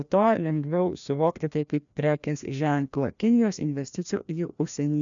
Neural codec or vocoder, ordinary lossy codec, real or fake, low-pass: codec, 16 kHz, 1 kbps, FreqCodec, larger model; MP3, 96 kbps; fake; 7.2 kHz